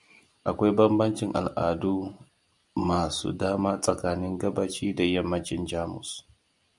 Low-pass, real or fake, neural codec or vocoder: 10.8 kHz; real; none